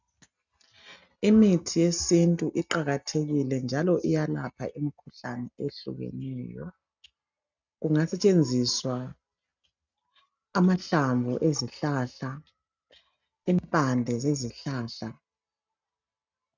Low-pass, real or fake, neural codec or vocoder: 7.2 kHz; real; none